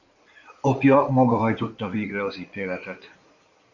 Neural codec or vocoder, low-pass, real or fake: codec, 16 kHz in and 24 kHz out, 2.2 kbps, FireRedTTS-2 codec; 7.2 kHz; fake